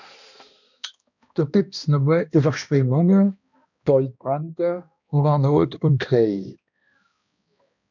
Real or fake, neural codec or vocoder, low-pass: fake; codec, 16 kHz, 1 kbps, X-Codec, HuBERT features, trained on balanced general audio; 7.2 kHz